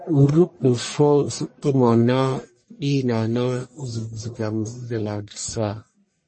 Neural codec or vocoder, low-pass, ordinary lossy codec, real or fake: codec, 44.1 kHz, 1.7 kbps, Pupu-Codec; 9.9 kHz; MP3, 32 kbps; fake